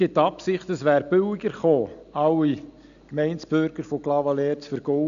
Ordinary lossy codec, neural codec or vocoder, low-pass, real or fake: none; none; 7.2 kHz; real